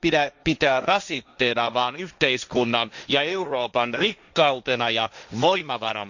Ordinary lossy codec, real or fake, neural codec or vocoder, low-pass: none; fake; codec, 16 kHz, 1.1 kbps, Voila-Tokenizer; 7.2 kHz